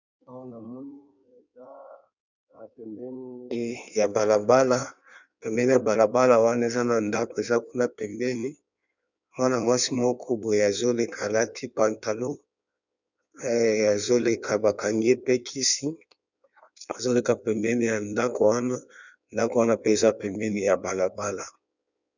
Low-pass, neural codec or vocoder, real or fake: 7.2 kHz; codec, 16 kHz in and 24 kHz out, 1.1 kbps, FireRedTTS-2 codec; fake